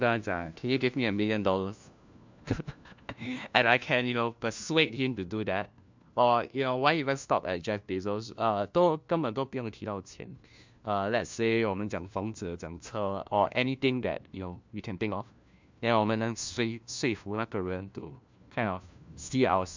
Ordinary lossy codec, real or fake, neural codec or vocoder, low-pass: MP3, 64 kbps; fake; codec, 16 kHz, 1 kbps, FunCodec, trained on LibriTTS, 50 frames a second; 7.2 kHz